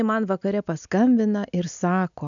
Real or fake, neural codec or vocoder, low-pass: real; none; 7.2 kHz